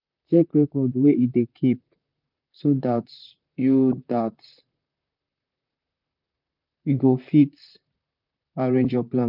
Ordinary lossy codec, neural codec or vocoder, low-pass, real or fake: none; vocoder, 44.1 kHz, 128 mel bands, Pupu-Vocoder; 5.4 kHz; fake